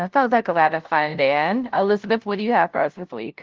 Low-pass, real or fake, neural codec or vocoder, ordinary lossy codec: 7.2 kHz; fake; codec, 16 kHz, 0.5 kbps, FunCodec, trained on LibriTTS, 25 frames a second; Opus, 16 kbps